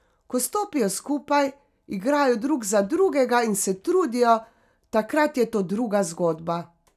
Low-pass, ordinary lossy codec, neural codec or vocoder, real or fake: 14.4 kHz; none; none; real